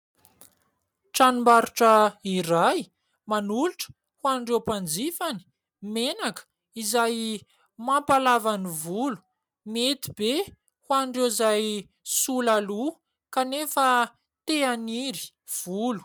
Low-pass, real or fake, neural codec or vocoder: 19.8 kHz; real; none